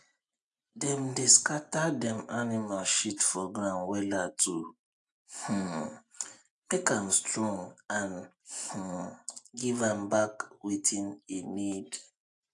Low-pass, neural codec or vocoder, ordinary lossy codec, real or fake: 10.8 kHz; none; AAC, 64 kbps; real